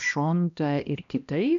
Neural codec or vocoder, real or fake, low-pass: codec, 16 kHz, 1 kbps, X-Codec, HuBERT features, trained on balanced general audio; fake; 7.2 kHz